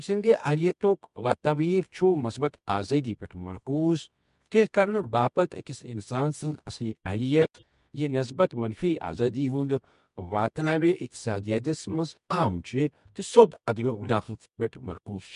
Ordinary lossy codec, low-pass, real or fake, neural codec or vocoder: MP3, 64 kbps; 10.8 kHz; fake; codec, 24 kHz, 0.9 kbps, WavTokenizer, medium music audio release